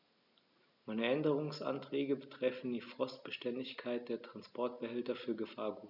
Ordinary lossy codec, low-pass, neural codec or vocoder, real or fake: none; 5.4 kHz; none; real